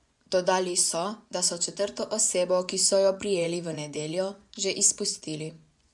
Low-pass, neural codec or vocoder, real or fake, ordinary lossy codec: 10.8 kHz; vocoder, 24 kHz, 100 mel bands, Vocos; fake; MP3, 96 kbps